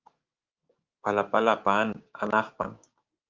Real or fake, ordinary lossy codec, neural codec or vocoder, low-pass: fake; Opus, 24 kbps; codec, 16 kHz, 6 kbps, DAC; 7.2 kHz